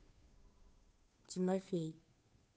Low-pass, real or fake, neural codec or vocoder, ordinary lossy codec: none; fake; codec, 16 kHz, 2 kbps, FunCodec, trained on Chinese and English, 25 frames a second; none